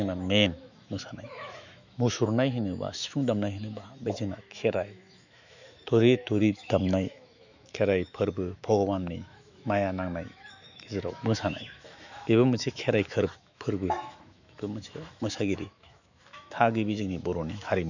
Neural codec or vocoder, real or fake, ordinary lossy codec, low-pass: autoencoder, 48 kHz, 128 numbers a frame, DAC-VAE, trained on Japanese speech; fake; none; 7.2 kHz